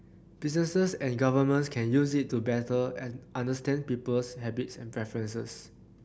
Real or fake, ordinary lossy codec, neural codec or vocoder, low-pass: real; none; none; none